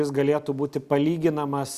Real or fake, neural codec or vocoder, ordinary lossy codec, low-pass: real; none; Opus, 64 kbps; 14.4 kHz